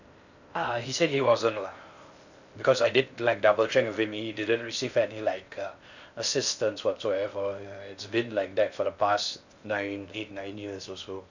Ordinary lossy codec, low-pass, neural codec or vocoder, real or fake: none; 7.2 kHz; codec, 16 kHz in and 24 kHz out, 0.6 kbps, FocalCodec, streaming, 4096 codes; fake